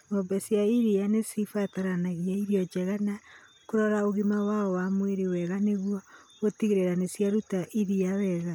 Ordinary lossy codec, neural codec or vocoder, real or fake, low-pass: none; none; real; 19.8 kHz